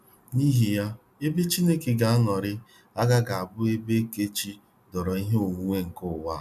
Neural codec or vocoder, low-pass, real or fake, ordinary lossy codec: none; 14.4 kHz; real; none